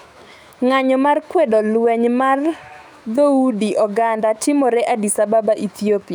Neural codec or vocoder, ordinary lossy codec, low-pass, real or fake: autoencoder, 48 kHz, 128 numbers a frame, DAC-VAE, trained on Japanese speech; none; 19.8 kHz; fake